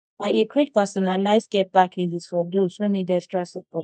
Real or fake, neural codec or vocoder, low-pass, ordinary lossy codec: fake; codec, 24 kHz, 0.9 kbps, WavTokenizer, medium music audio release; none; none